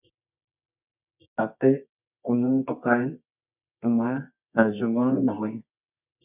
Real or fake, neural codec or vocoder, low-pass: fake; codec, 24 kHz, 0.9 kbps, WavTokenizer, medium music audio release; 3.6 kHz